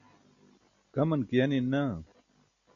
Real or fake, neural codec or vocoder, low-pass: real; none; 7.2 kHz